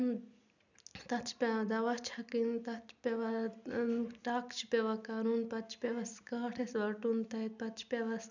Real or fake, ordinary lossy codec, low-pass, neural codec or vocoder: real; none; 7.2 kHz; none